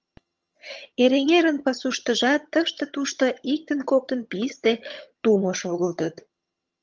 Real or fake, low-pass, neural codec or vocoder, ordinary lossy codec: fake; 7.2 kHz; vocoder, 22.05 kHz, 80 mel bands, HiFi-GAN; Opus, 24 kbps